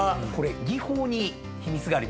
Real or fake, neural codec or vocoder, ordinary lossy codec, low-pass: real; none; none; none